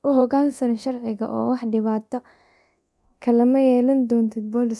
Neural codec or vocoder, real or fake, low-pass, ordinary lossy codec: codec, 24 kHz, 0.9 kbps, DualCodec; fake; none; none